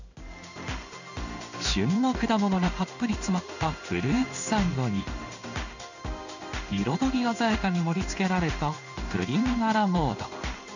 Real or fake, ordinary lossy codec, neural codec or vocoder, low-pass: fake; none; codec, 16 kHz in and 24 kHz out, 1 kbps, XY-Tokenizer; 7.2 kHz